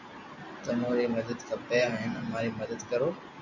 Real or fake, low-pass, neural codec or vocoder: real; 7.2 kHz; none